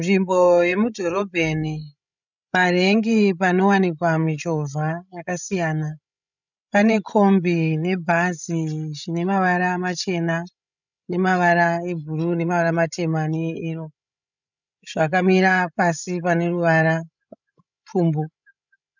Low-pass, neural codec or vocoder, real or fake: 7.2 kHz; codec, 16 kHz, 16 kbps, FreqCodec, larger model; fake